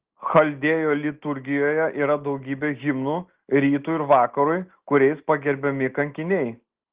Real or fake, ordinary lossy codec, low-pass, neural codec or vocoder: real; Opus, 16 kbps; 3.6 kHz; none